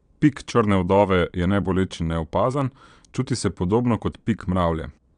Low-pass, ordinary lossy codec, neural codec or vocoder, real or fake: 9.9 kHz; none; vocoder, 22.05 kHz, 80 mel bands, Vocos; fake